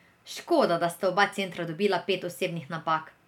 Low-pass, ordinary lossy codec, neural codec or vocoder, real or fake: 19.8 kHz; none; none; real